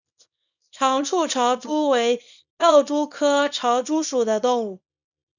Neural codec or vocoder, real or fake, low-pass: codec, 24 kHz, 0.9 kbps, WavTokenizer, small release; fake; 7.2 kHz